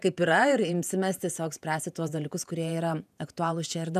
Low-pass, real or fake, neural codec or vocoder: 14.4 kHz; fake; vocoder, 48 kHz, 128 mel bands, Vocos